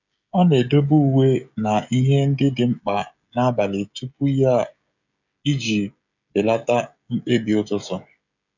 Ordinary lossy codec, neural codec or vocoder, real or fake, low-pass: none; codec, 16 kHz, 16 kbps, FreqCodec, smaller model; fake; 7.2 kHz